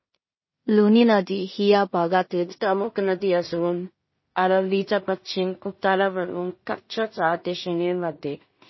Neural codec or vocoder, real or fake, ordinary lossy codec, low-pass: codec, 16 kHz in and 24 kHz out, 0.4 kbps, LongCat-Audio-Codec, two codebook decoder; fake; MP3, 24 kbps; 7.2 kHz